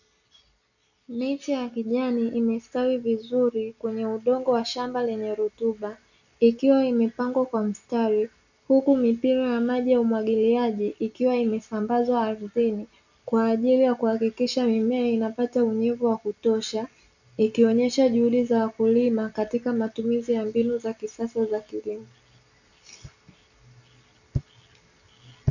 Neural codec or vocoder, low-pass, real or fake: none; 7.2 kHz; real